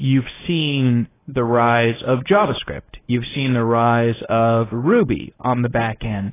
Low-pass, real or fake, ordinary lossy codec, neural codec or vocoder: 3.6 kHz; fake; AAC, 16 kbps; codec, 24 kHz, 0.9 kbps, WavTokenizer, medium speech release version 1